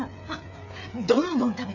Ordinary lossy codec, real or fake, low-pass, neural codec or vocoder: none; fake; 7.2 kHz; codec, 16 kHz, 8 kbps, FreqCodec, smaller model